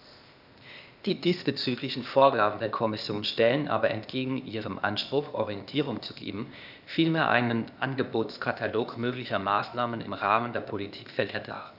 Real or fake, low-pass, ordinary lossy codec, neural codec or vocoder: fake; 5.4 kHz; none; codec, 16 kHz, 0.8 kbps, ZipCodec